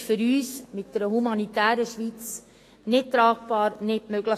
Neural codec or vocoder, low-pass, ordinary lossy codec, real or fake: autoencoder, 48 kHz, 128 numbers a frame, DAC-VAE, trained on Japanese speech; 14.4 kHz; AAC, 48 kbps; fake